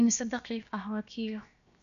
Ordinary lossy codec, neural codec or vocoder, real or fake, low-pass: none; codec, 16 kHz, 0.7 kbps, FocalCodec; fake; 7.2 kHz